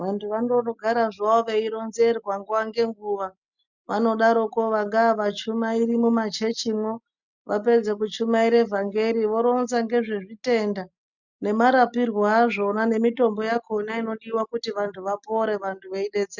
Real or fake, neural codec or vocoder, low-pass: real; none; 7.2 kHz